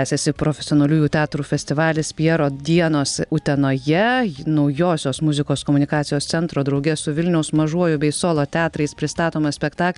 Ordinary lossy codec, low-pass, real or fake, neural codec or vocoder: MP3, 96 kbps; 10.8 kHz; real; none